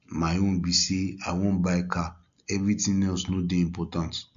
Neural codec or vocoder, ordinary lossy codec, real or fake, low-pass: none; AAC, 64 kbps; real; 7.2 kHz